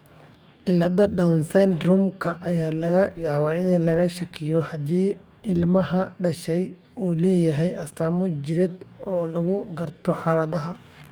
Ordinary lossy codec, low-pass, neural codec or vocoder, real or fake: none; none; codec, 44.1 kHz, 2.6 kbps, DAC; fake